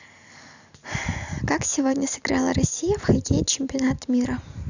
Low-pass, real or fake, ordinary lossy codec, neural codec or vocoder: 7.2 kHz; real; none; none